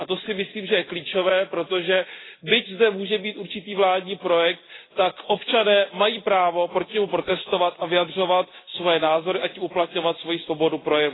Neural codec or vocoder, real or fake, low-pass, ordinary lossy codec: none; real; 7.2 kHz; AAC, 16 kbps